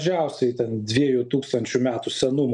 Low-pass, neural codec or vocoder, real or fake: 10.8 kHz; none; real